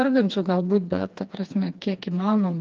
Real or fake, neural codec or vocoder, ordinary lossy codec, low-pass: fake; codec, 16 kHz, 2 kbps, FreqCodec, smaller model; Opus, 24 kbps; 7.2 kHz